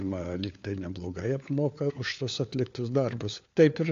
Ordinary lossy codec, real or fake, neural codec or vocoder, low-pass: AAC, 48 kbps; fake; codec, 16 kHz, 4 kbps, FunCodec, trained on LibriTTS, 50 frames a second; 7.2 kHz